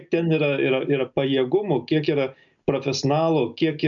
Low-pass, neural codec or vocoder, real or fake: 7.2 kHz; none; real